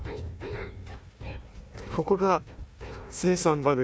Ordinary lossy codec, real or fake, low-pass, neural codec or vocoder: none; fake; none; codec, 16 kHz, 1 kbps, FunCodec, trained on Chinese and English, 50 frames a second